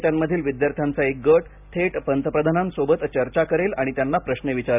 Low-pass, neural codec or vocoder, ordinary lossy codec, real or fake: 3.6 kHz; none; none; real